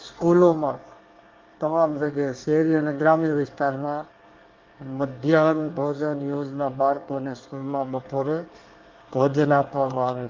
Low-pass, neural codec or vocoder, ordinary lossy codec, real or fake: 7.2 kHz; codec, 24 kHz, 1 kbps, SNAC; Opus, 32 kbps; fake